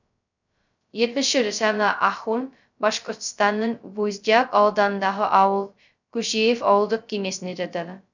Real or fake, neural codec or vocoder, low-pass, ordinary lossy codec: fake; codec, 16 kHz, 0.2 kbps, FocalCodec; 7.2 kHz; none